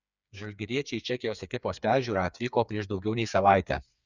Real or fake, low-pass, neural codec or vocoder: fake; 7.2 kHz; codec, 16 kHz, 4 kbps, FreqCodec, smaller model